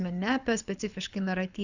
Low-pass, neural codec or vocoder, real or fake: 7.2 kHz; codec, 24 kHz, 6 kbps, HILCodec; fake